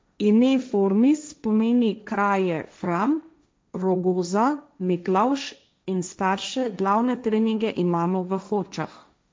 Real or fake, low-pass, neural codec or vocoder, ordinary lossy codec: fake; none; codec, 16 kHz, 1.1 kbps, Voila-Tokenizer; none